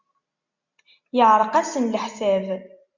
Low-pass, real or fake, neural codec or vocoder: 7.2 kHz; real; none